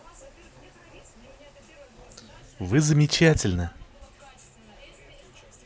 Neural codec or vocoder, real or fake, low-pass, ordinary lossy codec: none; real; none; none